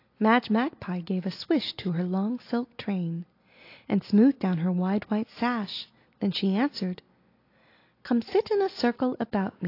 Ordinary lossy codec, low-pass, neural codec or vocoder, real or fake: AAC, 32 kbps; 5.4 kHz; none; real